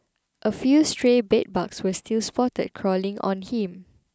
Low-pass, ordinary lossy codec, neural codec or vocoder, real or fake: none; none; none; real